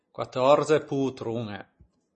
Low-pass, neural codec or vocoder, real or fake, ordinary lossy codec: 10.8 kHz; none; real; MP3, 32 kbps